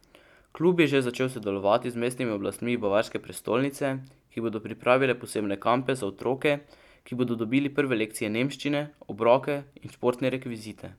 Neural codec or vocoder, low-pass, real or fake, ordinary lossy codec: none; 19.8 kHz; real; none